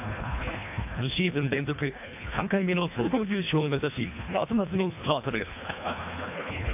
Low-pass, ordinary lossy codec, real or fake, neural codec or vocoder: 3.6 kHz; AAC, 32 kbps; fake; codec, 24 kHz, 1.5 kbps, HILCodec